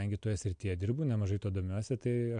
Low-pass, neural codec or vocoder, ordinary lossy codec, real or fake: 9.9 kHz; none; MP3, 64 kbps; real